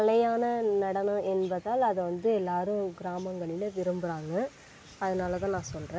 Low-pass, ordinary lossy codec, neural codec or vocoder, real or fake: none; none; none; real